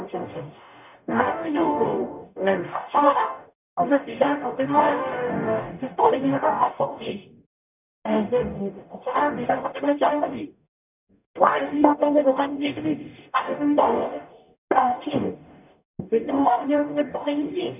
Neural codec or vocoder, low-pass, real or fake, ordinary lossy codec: codec, 44.1 kHz, 0.9 kbps, DAC; 3.6 kHz; fake; none